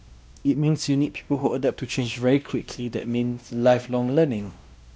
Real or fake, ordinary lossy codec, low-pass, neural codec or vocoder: fake; none; none; codec, 16 kHz, 1 kbps, X-Codec, WavLM features, trained on Multilingual LibriSpeech